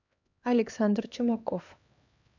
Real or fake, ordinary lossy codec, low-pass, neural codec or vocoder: fake; none; 7.2 kHz; codec, 16 kHz, 2 kbps, X-Codec, HuBERT features, trained on LibriSpeech